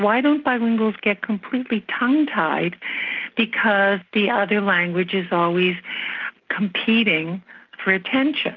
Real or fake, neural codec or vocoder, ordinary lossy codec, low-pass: real; none; Opus, 24 kbps; 7.2 kHz